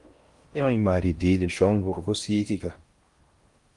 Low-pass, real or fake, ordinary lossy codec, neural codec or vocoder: 10.8 kHz; fake; Opus, 32 kbps; codec, 16 kHz in and 24 kHz out, 0.6 kbps, FocalCodec, streaming, 4096 codes